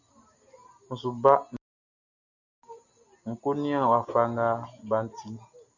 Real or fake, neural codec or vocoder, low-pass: real; none; 7.2 kHz